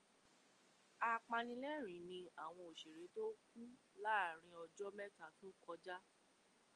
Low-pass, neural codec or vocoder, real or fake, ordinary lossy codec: 9.9 kHz; none; real; Opus, 32 kbps